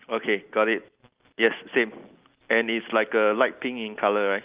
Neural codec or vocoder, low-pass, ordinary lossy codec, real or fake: none; 3.6 kHz; Opus, 24 kbps; real